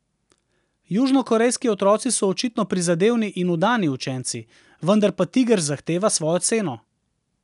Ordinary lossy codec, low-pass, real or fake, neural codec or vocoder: none; 10.8 kHz; real; none